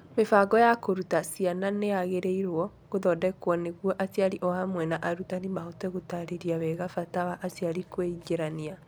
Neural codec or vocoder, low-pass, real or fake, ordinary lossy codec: none; none; real; none